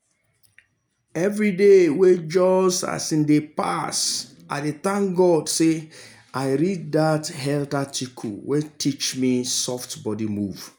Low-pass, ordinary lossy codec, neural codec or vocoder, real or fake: none; none; none; real